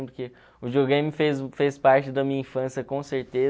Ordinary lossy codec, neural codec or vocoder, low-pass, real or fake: none; none; none; real